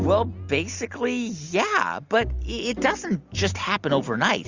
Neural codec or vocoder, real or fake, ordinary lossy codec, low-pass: none; real; Opus, 64 kbps; 7.2 kHz